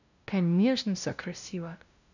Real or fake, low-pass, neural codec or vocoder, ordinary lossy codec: fake; 7.2 kHz; codec, 16 kHz, 0.5 kbps, FunCodec, trained on LibriTTS, 25 frames a second; none